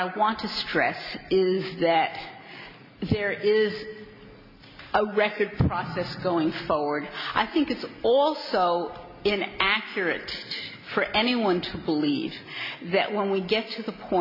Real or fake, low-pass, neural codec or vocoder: real; 5.4 kHz; none